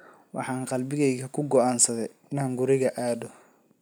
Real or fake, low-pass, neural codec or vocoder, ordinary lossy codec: real; none; none; none